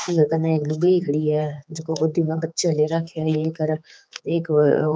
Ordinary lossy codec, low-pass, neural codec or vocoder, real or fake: none; none; codec, 16 kHz, 4 kbps, X-Codec, HuBERT features, trained on general audio; fake